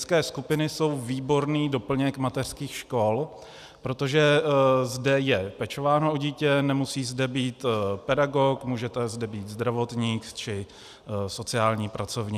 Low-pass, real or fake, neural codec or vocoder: 14.4 kHz; real; none